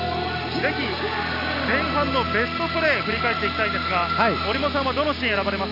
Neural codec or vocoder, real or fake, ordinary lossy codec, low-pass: none; real; none; 5.4 kHz